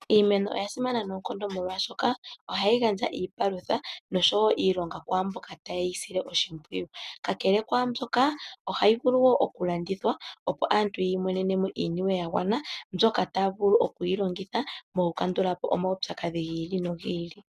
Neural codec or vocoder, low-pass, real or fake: none; 14.4 kHz; real